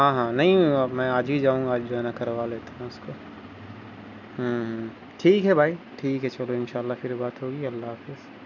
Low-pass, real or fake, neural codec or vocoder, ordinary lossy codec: 7.2 kHz; real; none; none